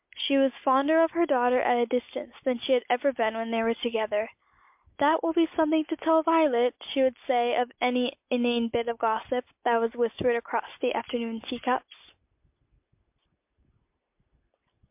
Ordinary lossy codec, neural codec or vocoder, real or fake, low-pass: MP3, 32 kbps; none; real; 3.6 kHz